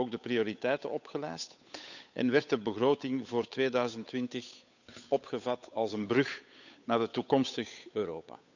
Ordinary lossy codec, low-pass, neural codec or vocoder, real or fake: none; 7.2 kHz; codec, 16 kHz, 8 kbps, FunCodec, trained on Chinese and English, 25 frames a second; fake